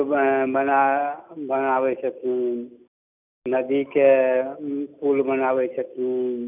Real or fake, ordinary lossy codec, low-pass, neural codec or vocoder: real; none; 3.6 kHz; none